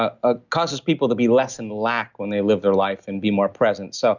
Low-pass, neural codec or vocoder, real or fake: 7.2 kHz; none; real